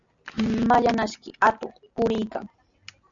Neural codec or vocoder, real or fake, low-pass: none; real; 7.2 kHz